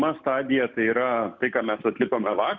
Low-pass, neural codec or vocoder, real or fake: 7.2 kHz; none; real